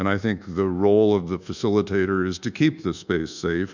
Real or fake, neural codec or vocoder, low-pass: fake; codec, 24 kHz, 1.2 kbps, DualCodec; 7.2 kHz